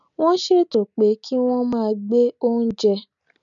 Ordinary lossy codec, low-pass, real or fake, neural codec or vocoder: none; 7.2 kHz; real; none